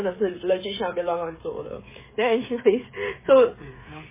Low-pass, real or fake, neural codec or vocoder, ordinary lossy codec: 3.6 kHz; fake; codec, 16 kHz, 16 kbps, FreqCodec, smaller model; MP3, 16 kbps